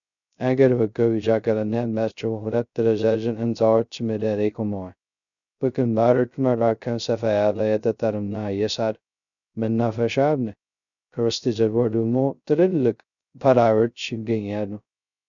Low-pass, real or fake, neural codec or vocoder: 7.2 kHz; fake; codec, 16 kHz, 0.2 kbps, FocalCodec